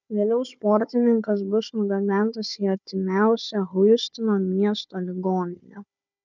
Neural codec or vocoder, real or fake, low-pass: codec, 16 kHz, 4 kbps, FunCodec, trained on Chinese and English, 50 frames a second; fake; 7.2 kHz